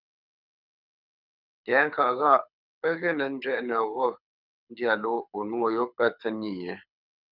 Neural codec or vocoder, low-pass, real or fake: codec, 24 kHz, 6 kbps, HILCodec; 5.4 kHz; fake